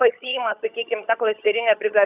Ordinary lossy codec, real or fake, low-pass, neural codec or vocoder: Opus, 64 kbps; fake; 3.6 kHz; codec, 16 kHz, 16 kbps, FunCodec, trained on LibriTTS, 50 frames a second